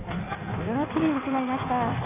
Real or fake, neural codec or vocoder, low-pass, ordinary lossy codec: fake; codec, 16 kHz in and 24 kHz out, 1.1 kbps, FireRedTTS-2 codec; 3.6 kHz; none